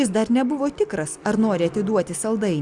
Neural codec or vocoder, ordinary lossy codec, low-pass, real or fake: none; Opus, 64 kbps; 10.8 kHz; real